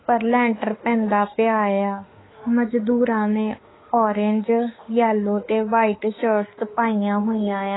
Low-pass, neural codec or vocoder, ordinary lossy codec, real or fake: 7.2 kHz; autoencoder, 48 kHz, 32 numbers a frame, DAC-VAE, trained on Japanese speech; AAC, 16 kbps; fake